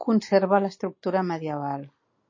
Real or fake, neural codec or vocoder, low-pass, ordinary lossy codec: real; none; 7.2 kHz; MP3, 32 kbps